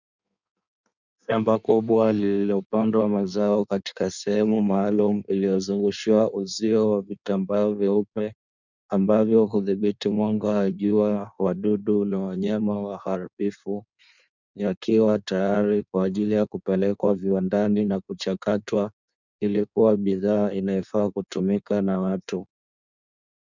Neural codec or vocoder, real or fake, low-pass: codec, 16 kHz in and 24 kHz out, 1.1 kbps, FireRedTTS-2 codec; fake; 7.2 kHz